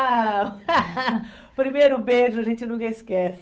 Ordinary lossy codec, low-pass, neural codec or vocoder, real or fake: none; none; codec, 16 kHz, 8 kbps, FunCodec, trained on Chinese and English, 25 frames a second; fake